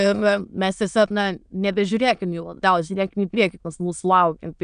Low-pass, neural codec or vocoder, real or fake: 9.9 kHz; autoencoder, 22.05 kHz, a latent of 192 numbers a frame, VITS, trained on many speakers; fake